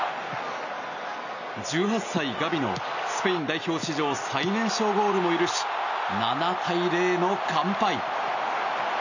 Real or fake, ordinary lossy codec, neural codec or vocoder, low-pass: real; none; none; 7.2 kHz